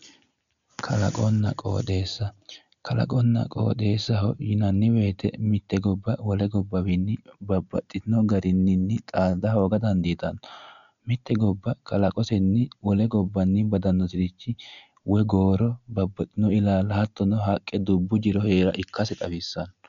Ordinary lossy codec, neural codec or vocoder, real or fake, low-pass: MP3, 96 kbps; none; real; 7.2 kHz